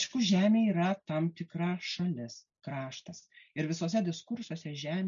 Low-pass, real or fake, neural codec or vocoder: 7.2 kHz; real; none